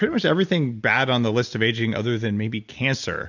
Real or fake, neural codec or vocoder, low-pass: real; none; 7.2 kHz